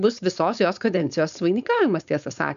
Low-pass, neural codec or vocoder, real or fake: 7.2 kHz; codec, 16 kHz, 4.8 kbps, FACodec; fake